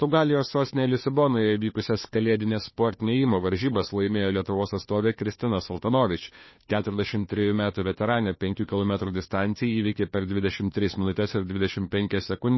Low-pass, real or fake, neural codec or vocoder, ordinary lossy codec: 7.2 kHz; fake; codec, 16 kHz, 2 kbps, FunCodec, trained on Chinese and English, 25 frames a second; MP3, 24 kbps